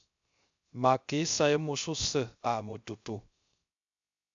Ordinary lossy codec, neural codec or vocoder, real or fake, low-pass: AAC, 64 kbps; codec, 16 kHz, 0.3 kbps, FocalCodec; fake; 7.2 kHz